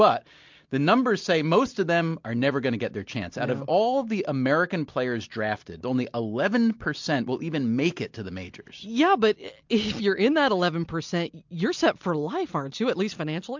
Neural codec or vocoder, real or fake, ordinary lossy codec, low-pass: none; real; MP3, 64 kbps; 7.2 kHz